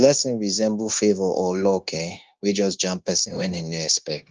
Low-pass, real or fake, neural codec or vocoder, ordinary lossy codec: 7.2 kHz; fake; codec, 16 kHz, 0.9 kbps, LongCat-Audio-Codec; Opus, 24 kbps